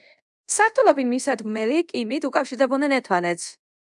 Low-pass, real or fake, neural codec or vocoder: 10.8 kHz; fake; codec, 24 kHz, 0.5 kbps, DualCodec